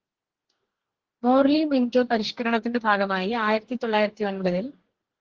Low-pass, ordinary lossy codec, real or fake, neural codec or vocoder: 7.2 kHz; Opus, 16 kbps; fake; codec, 44.1 kHz, 2.6 kbps, DAC